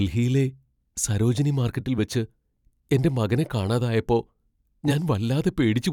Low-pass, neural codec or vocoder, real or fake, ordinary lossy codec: 19.8 kHz; vocoder, 44.1 kHz, 128 mel bands every 256 samples, BigVGAN v2; fake; none